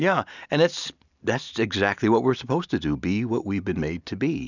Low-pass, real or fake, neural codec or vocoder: 7.2 kHz; real; none